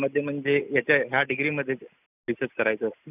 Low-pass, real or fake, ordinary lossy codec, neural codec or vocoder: 3.6 kHz; real; none; none